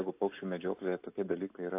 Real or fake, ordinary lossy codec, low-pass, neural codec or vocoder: real; AAC, 24 kbps; 3.6 kHz; none